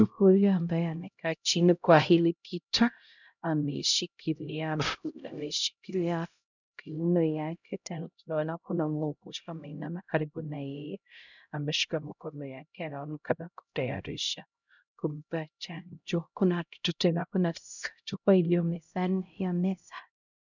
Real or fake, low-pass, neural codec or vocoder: fake; 7.2 kHz; codec, 16 kHz, 0.5 kbps, X-Codec, HuBERT features, trained on LibriSpeech